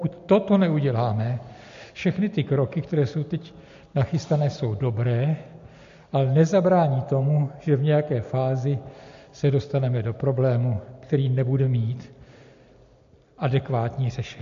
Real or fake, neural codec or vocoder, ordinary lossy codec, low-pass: real; none; MP3, 48 kbps; 7.2 kHz